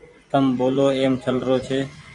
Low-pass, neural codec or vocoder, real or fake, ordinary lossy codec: 10.8 kHz; vocoder, 24 kHz, 100 mel bands, Vocos; fake; MP3, 96 kbps